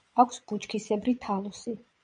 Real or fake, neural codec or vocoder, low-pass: fake; vocoder, 22.05 kHz, 80 mel bands, Vocos; 9.9 kHz